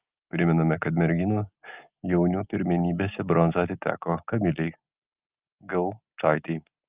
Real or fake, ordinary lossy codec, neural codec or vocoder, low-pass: real; Opus, 24 kbps; none; 3.6 kHz